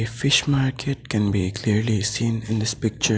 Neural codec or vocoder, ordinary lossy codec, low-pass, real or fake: none; none; none; real